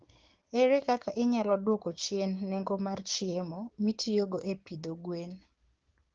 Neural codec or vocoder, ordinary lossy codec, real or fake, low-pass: codec, 16 kHz, 6 kbps, DAC; Opus, 16 kbps; fake; 7.2 kHz